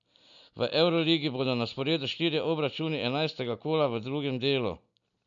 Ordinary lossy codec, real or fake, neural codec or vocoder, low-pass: none; real; none; 7.2 kHz